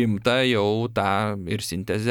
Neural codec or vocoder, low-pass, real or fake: none; 19.8 kHz; real